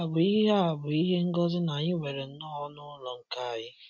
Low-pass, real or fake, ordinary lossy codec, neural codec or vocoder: 7.2 kHz; real; MP3, 48 kbps; none